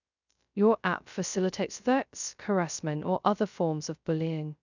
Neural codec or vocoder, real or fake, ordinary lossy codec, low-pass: codec, 16 kHz, 0.2 kbps, FocalCodec; fake; none; 7.2 kHz